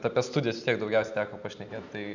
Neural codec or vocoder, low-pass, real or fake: none; 7.2 kHz; real